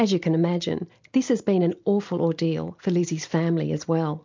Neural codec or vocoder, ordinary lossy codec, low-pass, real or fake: none; MP3, 64 kbps; 7.2 kHz; real